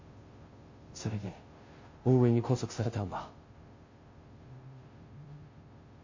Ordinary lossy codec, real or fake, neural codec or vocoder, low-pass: MP3, 32 kbps; fake; codec, 16 kHz, 0.5 kbps, FunCodec, trained on Chinese and English, 25 frames a second; 7.2 kHz